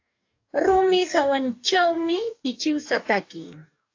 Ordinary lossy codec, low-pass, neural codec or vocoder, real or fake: AAC, 48 kbps; 7.2 kHz; codec, 44.1 kHz, 2.6 kbps, DAC; fake